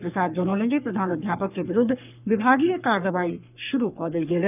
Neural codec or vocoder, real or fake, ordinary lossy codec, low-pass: codec, 44.1 kHz, 3.4 kbps, Pupu-Codec; fake; none; 3.6 kHz